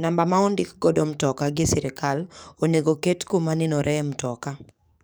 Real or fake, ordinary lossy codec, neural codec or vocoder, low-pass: fake; none; codec, 44.1 kHz, 7.8 kbps, DAC; none